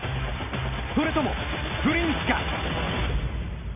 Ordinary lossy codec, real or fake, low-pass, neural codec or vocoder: none; real; 3.6 kHz; none